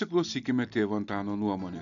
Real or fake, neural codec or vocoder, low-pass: real; none; 7.2 kHz